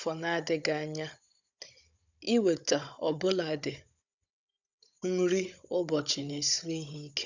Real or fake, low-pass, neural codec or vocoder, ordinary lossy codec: fake; 7.2 kHz; codec, 16 kHz, 16 kbps, FunCodec, trained on Chinese and English, 50 frames a second; none